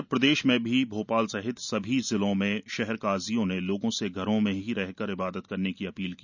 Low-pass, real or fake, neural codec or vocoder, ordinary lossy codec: 7.2 kHz; real; none; none